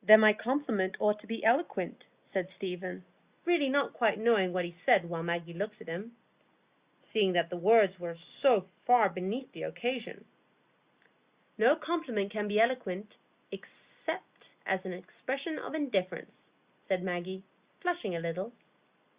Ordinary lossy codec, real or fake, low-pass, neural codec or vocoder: Opus, 64 kbps; real; 3.6 kHz; none